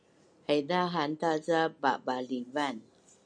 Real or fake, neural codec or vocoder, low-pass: real; none; 9.9 kHz